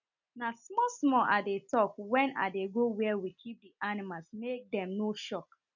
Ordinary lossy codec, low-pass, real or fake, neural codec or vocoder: none; 7.2 kHz; real; none